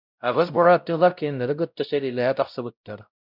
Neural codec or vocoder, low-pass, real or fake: codec, 16 kHz, 0.5 kbps, X-Codec, WavLM features, trained on Multilingual LibriSpeech; 5.4 kHz; fake